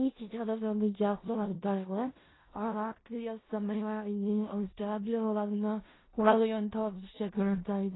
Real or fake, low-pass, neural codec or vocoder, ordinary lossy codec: fake; 7.2 kHz; codec, 16 kHz in and 24 kHz out, 0.4 kbps, LongCat-Audio-Codec, four codebook decoder; AAC, 16 kbps